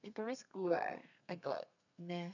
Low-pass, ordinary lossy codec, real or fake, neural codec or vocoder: 7.2 kHz; none; fake; codec, 32 kHz, 1.9 kbps, SNAC